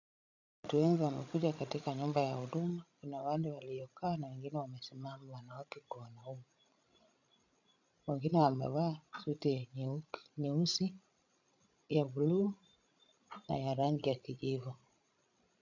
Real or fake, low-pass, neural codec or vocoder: fake; 7.2 kHz; codec, 16 kHz, 16 kbps, FreqCodec, larger model